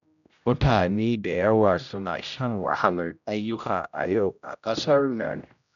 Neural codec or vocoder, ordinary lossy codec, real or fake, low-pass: codec, 16 kHz, 0.5 kbps, X-Codec, HuBERT features, trained on general audio; none; fake; 7.2 kHz